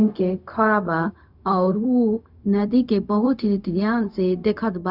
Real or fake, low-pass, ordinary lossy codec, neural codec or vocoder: fake; 5.4 kHz; none; codec, 16 kHz, 0.4 kbps, LongCat-Audio-Codec